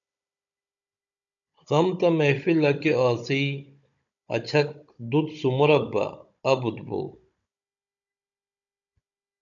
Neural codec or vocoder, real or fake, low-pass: codec, 16 kHz, 16 kbps, FunCodec, trained on Chinese and English, 50 frames a second; fake; 7.2 kHz